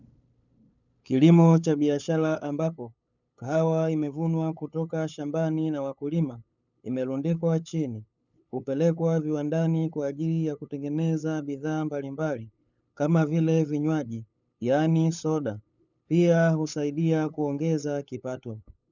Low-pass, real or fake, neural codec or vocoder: 7.2 kHz; fake; codec, 16 kHz, 8 kbps, FunCodec, trained on LibriTTS, 25 frames a second